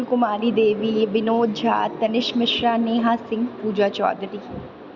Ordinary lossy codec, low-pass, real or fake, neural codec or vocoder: Opus, 64 kbps; 7.2 kHz; real; none